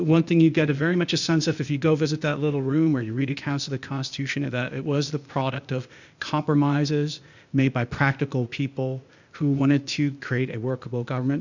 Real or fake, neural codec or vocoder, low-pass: fake; codec, 16 kHz, 0.8 kbps, ZipCodec; 7.2 kHz